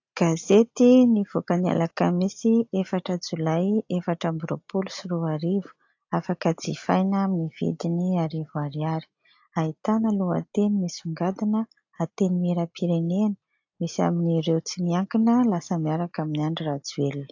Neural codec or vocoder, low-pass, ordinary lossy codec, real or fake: none; 7.2 kHz; AAC, 48 kbps; real